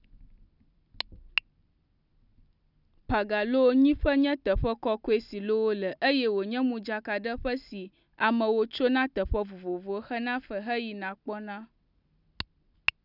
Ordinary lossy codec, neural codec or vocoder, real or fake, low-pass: none; none; real; 5.4 kHz